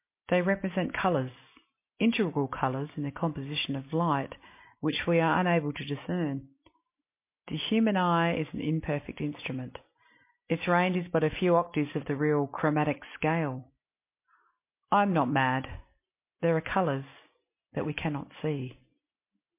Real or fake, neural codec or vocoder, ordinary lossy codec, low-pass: real; none; MP3, 24 kbps; 3.6 kHz